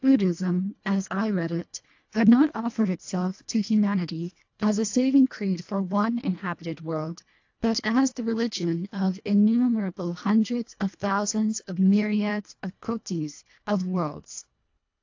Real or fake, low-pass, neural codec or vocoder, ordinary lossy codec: fake; 7.2 kHz; codec, 24 kHz, 1.5 kbps, HILCodec; AAC, 48 kbps